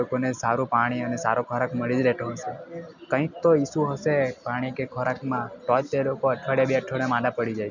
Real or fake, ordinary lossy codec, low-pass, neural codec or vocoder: real; none; 7.2 kHz; none